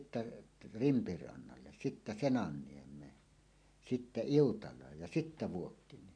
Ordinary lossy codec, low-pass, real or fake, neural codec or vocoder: MP3, 64 kbps; 9.9 kHz; real; none